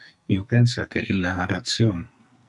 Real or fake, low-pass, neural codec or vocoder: fake; 10.8 kHz; codec, 44.1 kHz, 2.6 kbps, SNAC